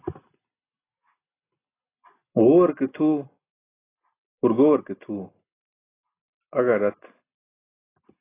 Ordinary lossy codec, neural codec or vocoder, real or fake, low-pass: AAC, 16 kbps; none; real; 3.6 kHz